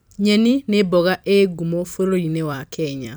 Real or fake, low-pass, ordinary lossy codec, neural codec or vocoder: fake; none; none; vocoder, 44.1 kHz, 128 mel bands every 256 samples, BigVGAN v2